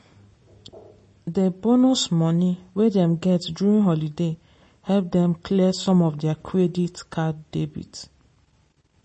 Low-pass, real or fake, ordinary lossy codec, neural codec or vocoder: 10.8 kHz; real; MP3, 32 kbps; none